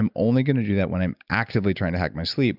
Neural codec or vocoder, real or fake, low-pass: none; real; 5.4 kHz